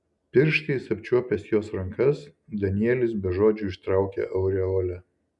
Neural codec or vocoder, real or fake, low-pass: none; real; 10.8 kHz